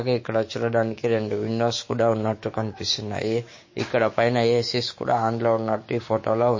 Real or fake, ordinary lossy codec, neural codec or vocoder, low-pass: fake; MP3, 32 kbps; codec, 16 kHz, 6 kbps, DAC; 7.2 kHz